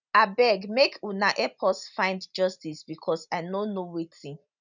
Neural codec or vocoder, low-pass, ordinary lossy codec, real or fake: none; 7.2 kHz; none; real